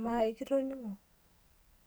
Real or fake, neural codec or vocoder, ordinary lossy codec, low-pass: fake; codec, 44.1 kHz, 2.6 kbps, SNAC; none; none